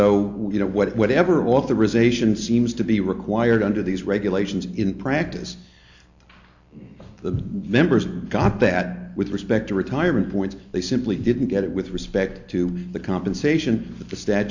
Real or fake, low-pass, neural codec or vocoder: real; 7.2 kHz; none